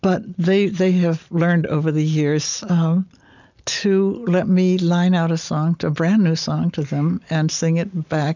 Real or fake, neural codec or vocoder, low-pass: real; none; 7.2 kHz